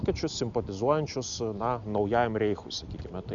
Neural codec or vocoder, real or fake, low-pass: none; real; 7.2 kHz